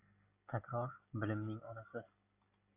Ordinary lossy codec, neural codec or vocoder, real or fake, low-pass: Opus, 64 kbps; none; real; 3.6 kHz